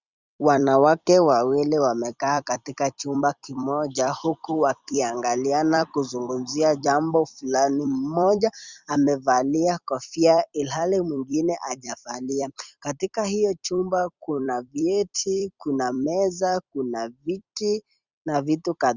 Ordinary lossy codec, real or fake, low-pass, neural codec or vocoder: Opus, 64 kbps; fake; 7.2 kHz; vocoder, 44.1 kHz, 128 mel bands every 256 samples, BigVGAN v2